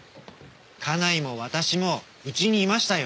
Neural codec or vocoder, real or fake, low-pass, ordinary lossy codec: none; real; none; none